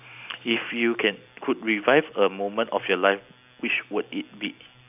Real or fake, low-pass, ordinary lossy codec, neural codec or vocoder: real; 3.6 kHz; none; none